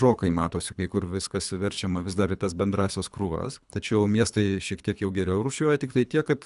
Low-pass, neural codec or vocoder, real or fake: 10.8 kHz; codec, 24 kHz, 3 kbps, HILCodec; fake